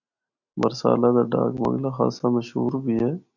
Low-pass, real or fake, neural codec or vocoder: 7.2 kHz; real; none